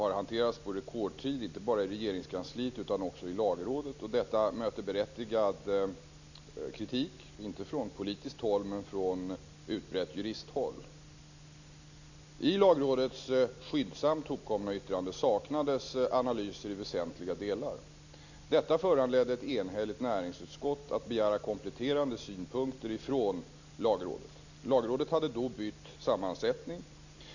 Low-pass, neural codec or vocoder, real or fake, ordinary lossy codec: 7.2 kHz; none; real; none